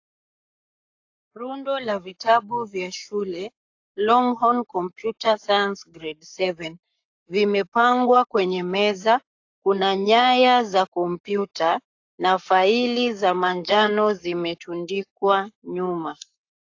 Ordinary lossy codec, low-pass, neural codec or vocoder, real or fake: AAC, 48 kbps; 7.2 kHz; codec, 44.1 kHz, 7.8 kbps, Pupu-Codec; fake